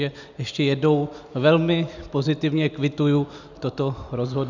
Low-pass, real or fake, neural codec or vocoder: 7.2 kHz; real; none